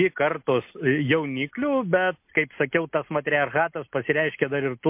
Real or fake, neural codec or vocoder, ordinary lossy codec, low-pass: real; none; MP3, 32 kbps; 3.6 kHz